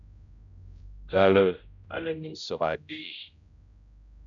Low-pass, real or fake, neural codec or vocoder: 7.2 kHz; fake; codec, 16 kHz, 0.5 kbps, X-Codec, HuBERT features, trained on general audio